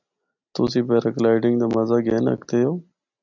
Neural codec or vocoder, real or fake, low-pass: none; real; 7.2 kHz